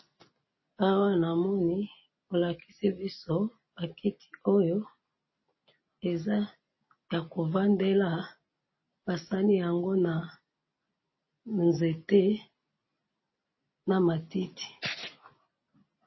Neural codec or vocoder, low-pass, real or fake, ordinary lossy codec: none; 7.2 kHz; real; MP3, 24 kbps